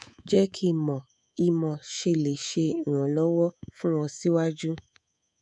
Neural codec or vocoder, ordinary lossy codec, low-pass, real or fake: codec, 24 kHz, 3.1 kbps, DualCodec; none; 10.8 kHz; fake